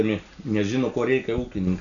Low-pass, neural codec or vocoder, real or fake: 7.2 kHz; none; real